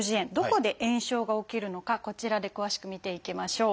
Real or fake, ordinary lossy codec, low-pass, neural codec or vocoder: real; none; none; none